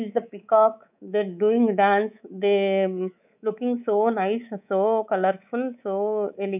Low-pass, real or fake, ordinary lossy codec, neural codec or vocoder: 3.6 kHz; fake; none; codec, 24 kHz, 3.1 kbps, DualCodec